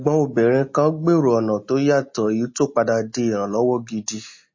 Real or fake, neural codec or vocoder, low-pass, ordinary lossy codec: real; none; 7.2 kHz; MP3, 32 kbps